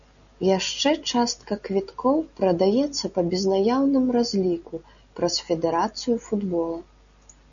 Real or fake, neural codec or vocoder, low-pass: real; none; 7.2 kHz